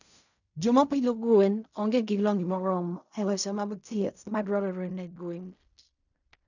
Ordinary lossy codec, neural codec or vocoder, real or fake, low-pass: none; codec, 16 kHz in and 24 kHz out, 0.4 kbps, LongCat-Audio-Codec, fine tuned four codebook decoder; fake; 7.2 kHz